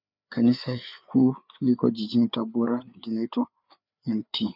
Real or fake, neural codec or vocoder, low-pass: fake; codec, 16 kHz, 4 kbps, FreqCodec, larger model; 5.4 kHz